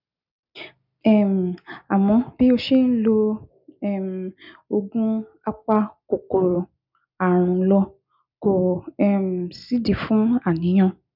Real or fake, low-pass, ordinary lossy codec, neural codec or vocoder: real; 5.4 kHz; none; none